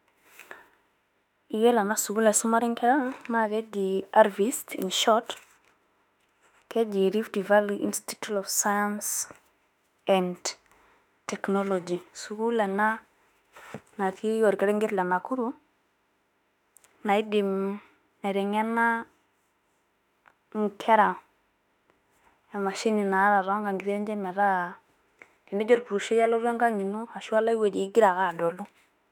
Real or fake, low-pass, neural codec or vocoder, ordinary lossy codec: fake; 19.8 kHz; autoencoder, 48 kHz, 32 numbers a frame, DAC-VAE, trained on Japanese speech; none